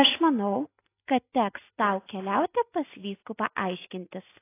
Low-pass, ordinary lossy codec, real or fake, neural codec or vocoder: 3.6 kHz; AAC, 24 kbps; real; none